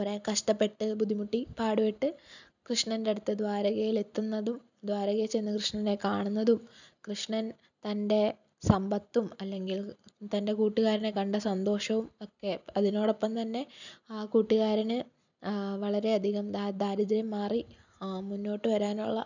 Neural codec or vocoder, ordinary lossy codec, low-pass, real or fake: none; none; 7.2 kHz; real